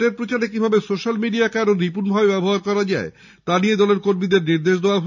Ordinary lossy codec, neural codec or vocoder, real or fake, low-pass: none; none; real; 7.2 kHz